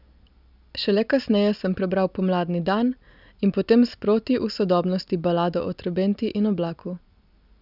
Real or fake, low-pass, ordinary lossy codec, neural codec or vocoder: real; 5.4 kHz; none; none